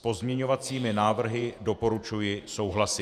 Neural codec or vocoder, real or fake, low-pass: vocoder, 48 kHz, 128 mel bands, Vocos; fake; 14.4 kHz